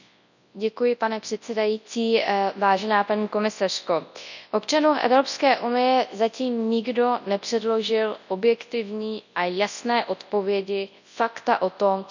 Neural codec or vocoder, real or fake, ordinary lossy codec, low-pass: codec, 24 kHz, 0.9 kbps, WavTokenizer, large speech release; fake; none; 7.2 kHz